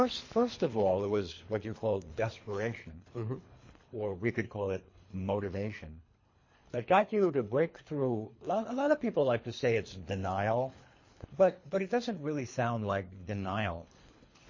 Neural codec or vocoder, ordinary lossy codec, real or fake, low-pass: codec, 24 kHz, 3 kbps, HILCodec; MP3, 32 kbps; fake; 7.2 kHz